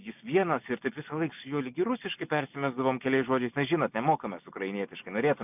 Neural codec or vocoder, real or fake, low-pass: none; real; 3.6 kHz